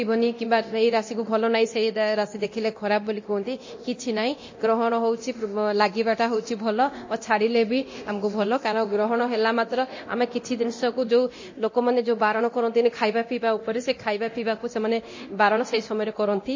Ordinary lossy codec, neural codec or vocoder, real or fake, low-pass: MP3, 32 kbps; codec, 24 kHz, 0.9 kbps, DualCodec; fake; 7.2 kHz